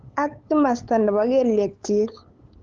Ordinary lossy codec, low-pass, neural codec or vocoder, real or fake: Opus, 16 kbps; 7.2 kHz; codec, 16 kHz, 8 kbps, FunCodec, trained on LibriTTS, 25 frames a second; fake